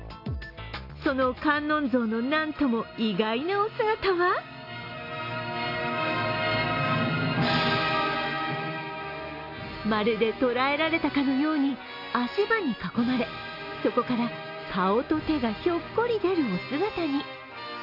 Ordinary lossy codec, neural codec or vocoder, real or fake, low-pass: AAC, 32 kbps; none; real; 5.4 kHz